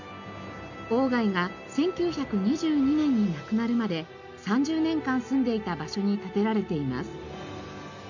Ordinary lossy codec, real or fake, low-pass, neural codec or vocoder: none; real; 7.2 kHz; none